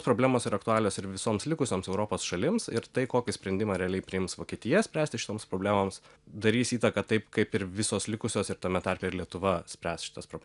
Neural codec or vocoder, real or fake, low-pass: none; real; 10.8 kHz